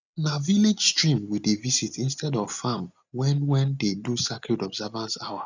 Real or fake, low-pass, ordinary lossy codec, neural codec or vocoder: real; 7.2 kHz; none; none